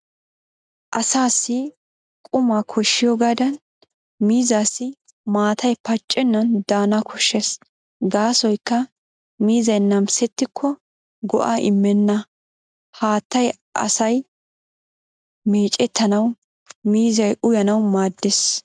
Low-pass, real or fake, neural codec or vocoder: 9.9 kHz; real; none